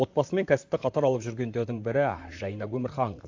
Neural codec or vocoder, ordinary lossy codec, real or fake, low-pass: vocoder, 44.1 kHz, 128 mel bands, Pupu-Vocoder; AAC, 48 kbps; fake; 7.2 kHz